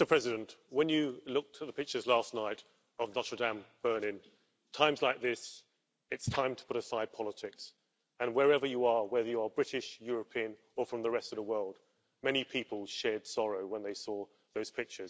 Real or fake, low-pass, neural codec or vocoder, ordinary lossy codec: real; none; none; none